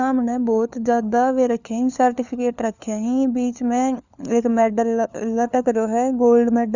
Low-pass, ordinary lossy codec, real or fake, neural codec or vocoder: 7.2 kHz; none; fake; codec, 16 kHz, 4 kbps, FunCodec, trained on LibriTTS, 50 frames a second